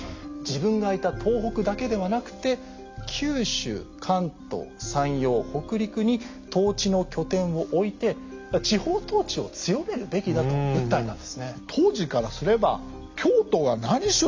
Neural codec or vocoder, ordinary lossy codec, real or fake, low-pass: none; MP3, 64 kbps; real; 7.2 kHz